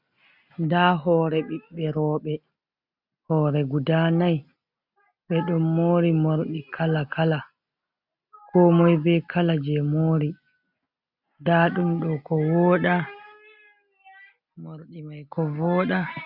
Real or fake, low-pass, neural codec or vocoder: real; 5.4 kHz; none